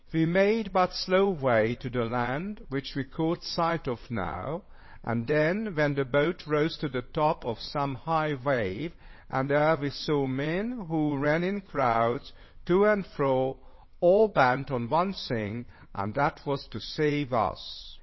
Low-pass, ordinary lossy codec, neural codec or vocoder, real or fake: 7.2 kHz; MP3, 24 kbps; vocoder, 22.05 kHz, 80 mel bands, WaveNeXt; fake